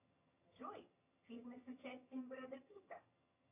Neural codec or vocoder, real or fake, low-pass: vocoder, 22.05 kHz, 80 mel bands, HiFi-GAN; fake; 3.6 kHz